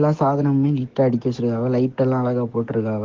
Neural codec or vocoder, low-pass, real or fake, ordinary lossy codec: codec, 44.1 kHz, 7.8 kbps, DAC; 7.2 kHz; fake; Opus, 16 kbps